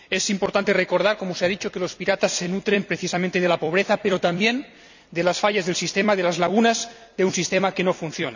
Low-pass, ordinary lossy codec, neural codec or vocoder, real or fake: 7.2 kHz; none; vocoder, 44.1 kHz, 128 mel bands every 256 samples, BigVGAN v2; fake